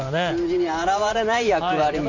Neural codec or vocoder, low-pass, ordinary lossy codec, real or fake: vocoder, 44.1 kHz, 128 mel bands every 512 samples, BigVGAN v2; 7.2 kHz; none; fake